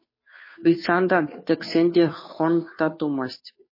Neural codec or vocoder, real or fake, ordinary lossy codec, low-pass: codec, 16 kHz, 2 kbps, FunCodec, trained on Chinese and English, 25 frames a second; fake; MP3, 24 kbps; 5.4 kHz